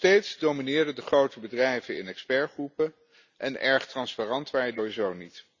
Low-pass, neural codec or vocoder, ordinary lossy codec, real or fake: 7.2 kHz; none; none; real